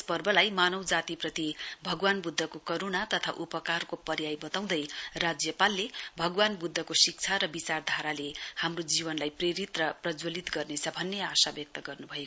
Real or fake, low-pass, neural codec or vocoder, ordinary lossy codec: real; none; none; none